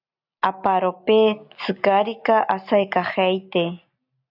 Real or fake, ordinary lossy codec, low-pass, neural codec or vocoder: real; AAC, 48 kbps; 5.4 kHz; none